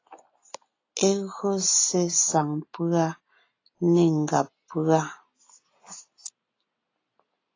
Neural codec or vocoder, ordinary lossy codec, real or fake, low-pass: none; AAC, 32 kbps; real; 7.2 kHz